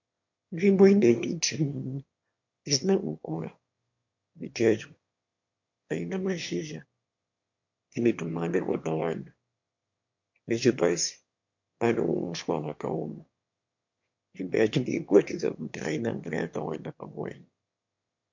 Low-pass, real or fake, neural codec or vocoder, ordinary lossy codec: 7.2 kHz; fake; autoencoder, 22.05 kHz, a latent of 192 numbers a frame, VITS, trained on one speaker; MP3, 48 kbps